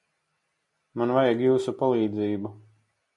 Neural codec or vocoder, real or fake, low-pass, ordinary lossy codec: none; real; 10.8 kHz; MP3, 48 kbps